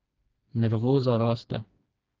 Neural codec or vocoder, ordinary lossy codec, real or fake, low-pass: codec, 16 kHz, 2 kbps, FreqCodec, smaller model; Opus, 24 kbps; fake; 7.2 kHz